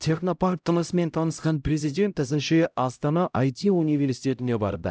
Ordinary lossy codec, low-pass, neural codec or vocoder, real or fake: none; none; codec, 16 kHz, 0.5 kbps, X-Codec, HuBERT features, trained on LibriSpeech; fake